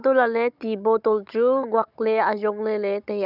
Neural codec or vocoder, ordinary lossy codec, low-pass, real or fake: codec, 16 kHz, 16 kbps, FunCodec, trained on Chinese and English, 50 frames a second; none; 5.4 kHz; fake